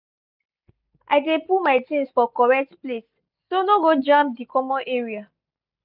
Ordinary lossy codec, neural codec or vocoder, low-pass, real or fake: none; none; 5.4 kHz; real